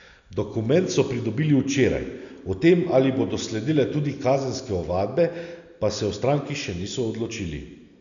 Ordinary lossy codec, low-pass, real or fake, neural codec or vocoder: none; 7.2 kHz; real; none